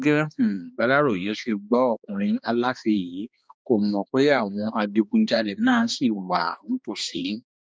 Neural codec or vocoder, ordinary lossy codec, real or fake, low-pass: codec, 16 kHz, 2 kbps, X-Codec, HuBERT features, trained on balanced general audio; none; fake; none